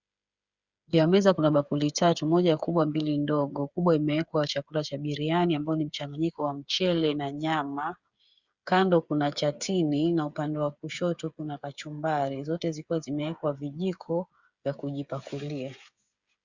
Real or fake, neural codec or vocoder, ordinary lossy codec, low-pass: fake; codec, 16 kHz, 8 kbps, FreqCodec, smaller model; Opus, 64 kbps; 7.2 kHz